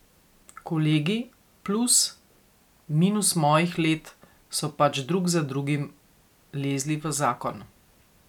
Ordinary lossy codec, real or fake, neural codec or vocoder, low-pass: none; real; none; 19.8 kHz